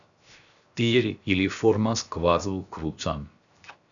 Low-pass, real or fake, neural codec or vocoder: 7.2 kHz; fake; codec, 16 kHz, 0.7 kbps, FocalCodec